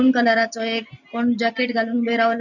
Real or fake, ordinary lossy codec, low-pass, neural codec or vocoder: real; none; 7.2 kHz; none